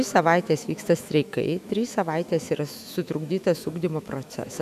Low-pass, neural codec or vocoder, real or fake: 14.4 kHz; autoencoder, 48 kHz, 128 numbers a frame, DAC-VAE, trained on Japanese speech; fake